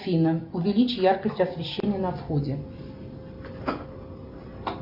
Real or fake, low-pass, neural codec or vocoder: real; 5.4 kHz; none